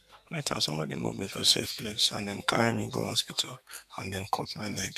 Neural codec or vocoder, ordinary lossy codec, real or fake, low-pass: codec, 32 kHz, 1.9 kbps, SNAC; none; fake; 14.4 kHz